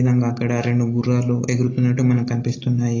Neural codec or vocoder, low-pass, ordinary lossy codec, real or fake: none; 7.2 kHz; AAC, 32 kbps; real